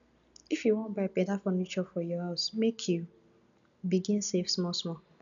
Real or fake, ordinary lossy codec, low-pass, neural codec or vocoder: real; none; 7.2 kHz; none